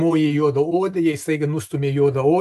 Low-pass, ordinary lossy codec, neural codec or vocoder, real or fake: 14.4 kHz; AAC, 96 kbps; none; real